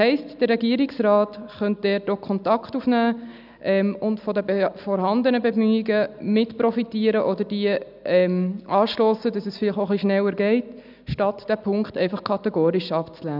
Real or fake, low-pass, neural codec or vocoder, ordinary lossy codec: real; 5.4 kHz; none; none